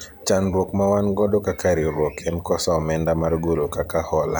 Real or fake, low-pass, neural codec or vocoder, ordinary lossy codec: fake; none; vocoder, 44.1 kHz, 128 mel bands every 512 samples, BigVGAN v2; none